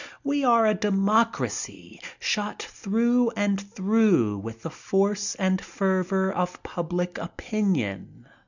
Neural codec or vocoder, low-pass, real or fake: none; 7.2 kHz; real